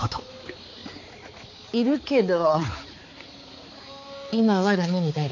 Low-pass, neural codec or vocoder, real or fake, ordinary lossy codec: 7.2 kHz; codec, 16 kHz, 4 kbps, X-Codec, HuBERT features, trained on balanced general audio; fake; none